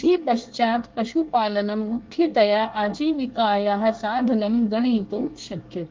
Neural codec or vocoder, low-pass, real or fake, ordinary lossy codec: codec, 24 kHz, 1 kbps, SNAC; 7.2 kHz; fake; Opus, 32 kbps